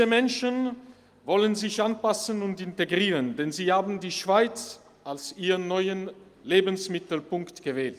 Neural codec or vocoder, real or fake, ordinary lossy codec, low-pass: none; real; Opus, 32 kbps; 14.4 kHz